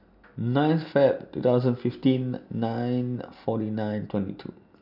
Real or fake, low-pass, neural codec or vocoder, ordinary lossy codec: real; 5.4 kHz; none; none